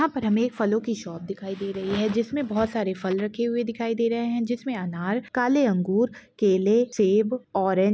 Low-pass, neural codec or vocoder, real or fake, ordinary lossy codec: none; none; real; none